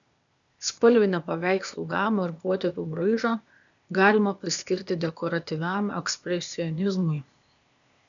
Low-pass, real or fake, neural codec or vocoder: 7.2 kHz; fake; codec, 16 kHz, 0.8 kbps, ZipCodec